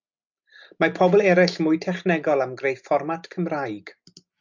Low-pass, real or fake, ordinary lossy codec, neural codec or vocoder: 7.2 kHz; real; MP3, 64 kbps; none